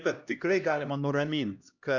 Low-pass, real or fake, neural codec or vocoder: 7.2 kHz; fake; codec, 16 kHz, 1 kbps, X-Codec, HuBERT features, trained on LibriSpeech